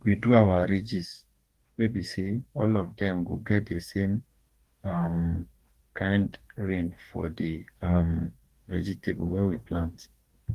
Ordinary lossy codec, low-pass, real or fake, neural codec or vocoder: Opus, 24 kbps; 14.4 kHz; fake; codec, 44.1 kHz, 2.6 kbps, DAC